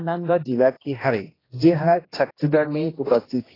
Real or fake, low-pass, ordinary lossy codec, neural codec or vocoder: fake; 5.4 kHz; AAC, 24 kbps; codec, 16 kHz, 1 kbps, X-Codec, HuBERT features, trained on general audio